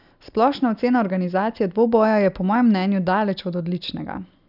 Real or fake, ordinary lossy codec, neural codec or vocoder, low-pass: real; none; none; 5.4 kHz